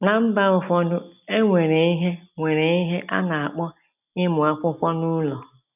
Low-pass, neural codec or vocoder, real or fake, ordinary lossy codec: 3.6 kHz; none; real; none